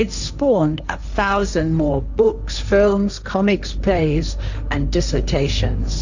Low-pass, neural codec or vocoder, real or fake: 7.2 kHz; codec, 16 kHz, 1.1 kbps, Voila-Tokenizer; fake